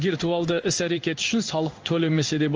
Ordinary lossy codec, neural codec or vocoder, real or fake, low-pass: Opus, 24 kbps; codec, 16 kHz in and 24 kHz out, 1 kbps, XY-Tokenizer; fake; 7.2 kHz